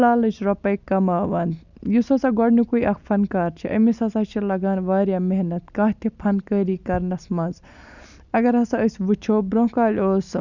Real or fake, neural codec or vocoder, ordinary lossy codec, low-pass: real; none; none; 7.2 kHz